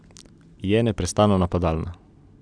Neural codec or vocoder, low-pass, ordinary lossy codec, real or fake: none; 9.9 kHz; none; real